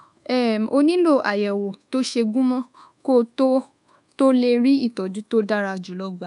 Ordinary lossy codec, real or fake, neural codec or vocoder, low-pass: none; fake; codec, 24 kHz, 1.2 kbps, DualCodec; 10.8 kHz